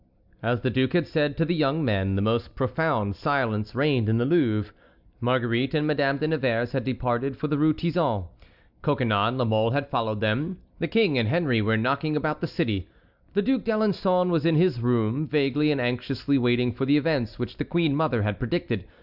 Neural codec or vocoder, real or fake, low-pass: none; real; 5.4 kHz